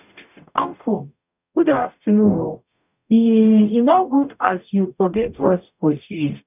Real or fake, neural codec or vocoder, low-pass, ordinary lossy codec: fake; codec, 44.1 kHz, 0.9 kbps, DAC; 3.6 kHz; none